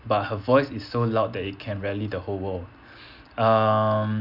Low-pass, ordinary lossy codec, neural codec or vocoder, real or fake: 5.4 kHz; none; none; real